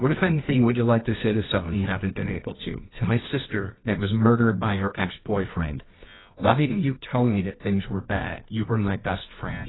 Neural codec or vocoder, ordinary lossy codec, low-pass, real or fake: codec, 24 kHz, 0.9 kbps, WavTokenizer, medium music audio release; AAC, 16 kbps; 7.2 kHz; fake